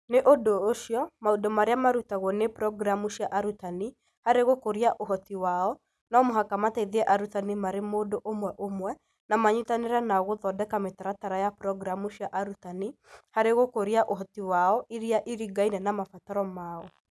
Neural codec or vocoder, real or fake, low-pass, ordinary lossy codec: none; real; none; none